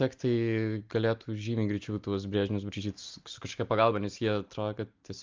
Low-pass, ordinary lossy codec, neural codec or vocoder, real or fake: 7.2 kHz; Opus, 24 kbps; none; real